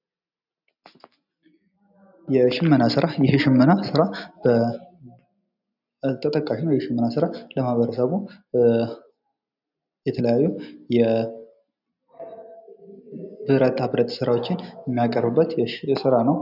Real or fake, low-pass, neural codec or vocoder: real; 5.4 kHz; none